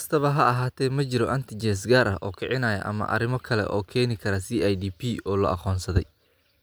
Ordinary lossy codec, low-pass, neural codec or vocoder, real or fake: none; none; none; real